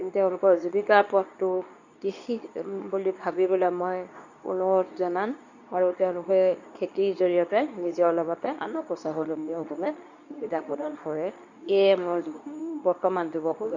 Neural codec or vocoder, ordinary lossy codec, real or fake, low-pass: codec, 24 kHz, 0.9 kbps, WavTokenizer, medium speech release version 2; none; fake; 7.2 kHz